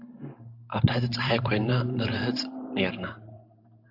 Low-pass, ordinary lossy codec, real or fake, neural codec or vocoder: 5.4 kHz; AAC, 48 kbps; fake; vocoder, 44.1 kHz, 128 mel bands every 512 samples, BigVGAN v2